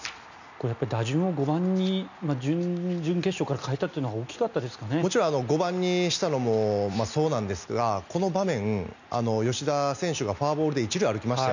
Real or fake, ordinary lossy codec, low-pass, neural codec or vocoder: real; none; 7.2 kHz; none